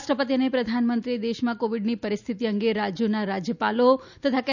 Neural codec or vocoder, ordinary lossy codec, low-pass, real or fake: none; none; 7.2 kHz; real